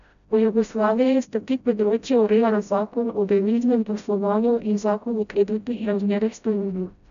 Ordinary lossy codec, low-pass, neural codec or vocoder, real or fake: none; 7.2 kHz; codec, 16 kHz, 0.5 kbps, FreqCodec, smaller model; fake